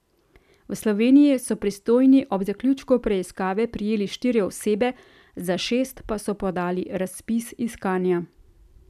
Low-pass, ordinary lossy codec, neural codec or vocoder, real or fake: 14.4 kHz; none; none; real